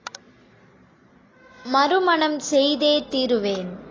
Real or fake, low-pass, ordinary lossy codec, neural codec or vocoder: real; 7.2 kHz; AAC, 32 kbps; none